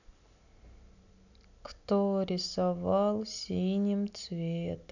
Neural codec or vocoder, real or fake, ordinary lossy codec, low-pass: none; real; none; 7.2 kHz